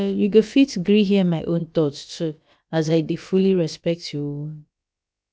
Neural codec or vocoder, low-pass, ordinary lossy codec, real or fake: codec, 16 kHz, about 1 kbps, DyCAST, with the encoder's durations; none; none; fake